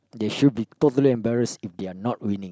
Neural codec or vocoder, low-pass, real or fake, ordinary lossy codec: none; none; real; none